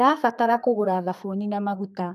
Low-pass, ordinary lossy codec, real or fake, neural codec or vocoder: 14.4 kHz; none; fake; codec, 32 kHz, 1.9 kbps, SNAC